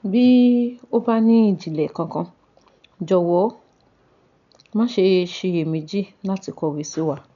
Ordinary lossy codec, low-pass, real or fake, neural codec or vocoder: none; 7.2 kHz; real; none